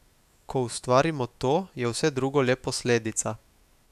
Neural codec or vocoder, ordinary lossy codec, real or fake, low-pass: autoencoder, 48 kHz, 128 numbers a frame, DAC-VAE, trained on Japanese speech; none; fake; 14.4 kHz